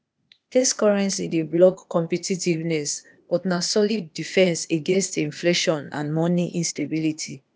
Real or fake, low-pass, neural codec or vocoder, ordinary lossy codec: fake; none; codec, 16 kHz, 0.8 kbps, ZipCodec; none